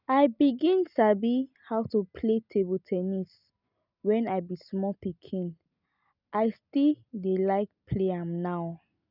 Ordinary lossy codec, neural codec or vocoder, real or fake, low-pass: none; none; real; 5.4 kHz